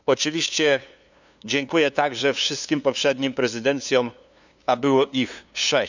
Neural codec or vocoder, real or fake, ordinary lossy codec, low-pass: codec, 16 kHz, 2 kbps, FunCodec, trained on LibriTTS, 25 frames a second; fake; none; 7.2 kHz